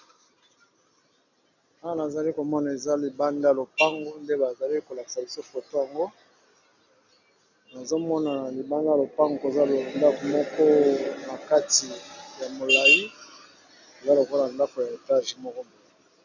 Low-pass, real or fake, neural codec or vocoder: 7.2 kHz; real; none